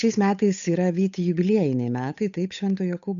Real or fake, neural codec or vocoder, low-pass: fake; codec, 16 kHz, 8 kbps, FunCodec, trained on Chinese and English, 25 frames a second; 7.2 kHz